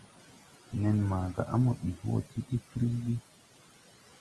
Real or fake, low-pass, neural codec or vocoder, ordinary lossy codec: real; 10.8 kHz; none; Opus, 24 kbps